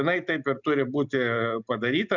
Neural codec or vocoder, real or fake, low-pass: none; real; 7.2 kHz